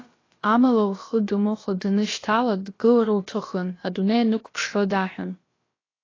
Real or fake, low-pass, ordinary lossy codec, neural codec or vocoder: fake; 7.2 kHz; AAC, 32 kbps; codec, 16 kHz, about 1 kbps, DyCAST, with the encoder's durations